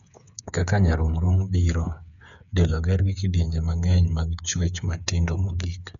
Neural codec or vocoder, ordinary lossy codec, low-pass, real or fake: codec, 16 kHz, 8 kbps, FreqCodec, smaller model; none; 7.2 kHz; fake